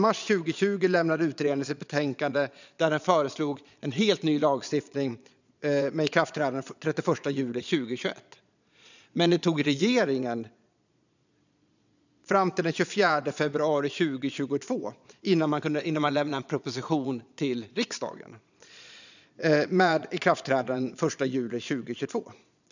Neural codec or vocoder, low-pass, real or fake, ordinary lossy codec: vocoder, 22.05 kHz, 80 mel bands, Vocos; 7.2 kHz; fake; none